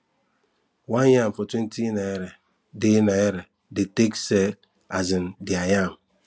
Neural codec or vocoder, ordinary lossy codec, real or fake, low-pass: none; none; real; none